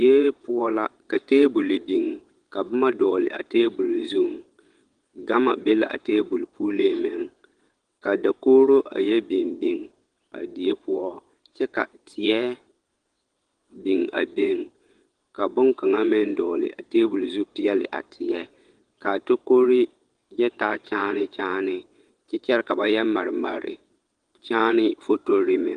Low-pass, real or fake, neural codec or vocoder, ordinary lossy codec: 9.9 kHz; fake; vocoder, 22.05 kHz, 80 mel bands, WaveNeXt; Opus, 24 kbps